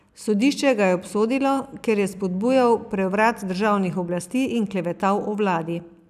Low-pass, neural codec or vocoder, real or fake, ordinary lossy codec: 14.4 kHz; vocoder, 44.1 kHz, 128 mel bands every 256 samples, BigVGAN v2; fake; none